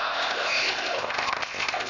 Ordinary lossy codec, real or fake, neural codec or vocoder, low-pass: none; fake; codec, 16 kHz, 0.8 kbps, ZipCodec; 7.2 kHz